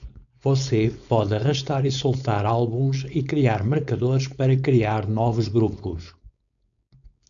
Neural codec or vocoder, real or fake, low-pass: codec, 16 kHz, 4.8 kbps, FACodec; fake; 7.2 kHz